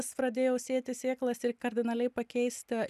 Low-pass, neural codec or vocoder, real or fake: 14.4 kHz; none; real